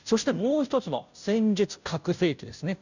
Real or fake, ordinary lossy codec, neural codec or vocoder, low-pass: fake; none; codec, 16 kHz, 0.5 kbps, FunCodec, trained on Chinese and English, 25 frames a second; 7.2 kHz